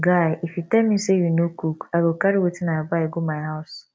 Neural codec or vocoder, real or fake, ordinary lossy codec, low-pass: none; real; none; none